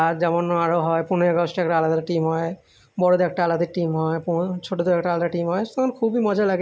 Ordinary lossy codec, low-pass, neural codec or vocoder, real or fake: none; none; none; real